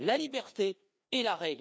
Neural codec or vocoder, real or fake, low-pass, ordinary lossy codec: codec, 16 kHz, 2 kbps, FreqCodec, larger model; fake; none; none